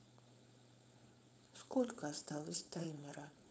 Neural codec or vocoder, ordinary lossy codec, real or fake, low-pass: codec, 16 kHz, 4.8 kbps, FACodec; none; fake; none